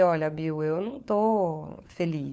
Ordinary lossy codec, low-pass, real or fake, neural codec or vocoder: none; none; fake; codec, 16 kHz, 4 kbps, FunCodec, trained on LibriTTS, 50 frames a second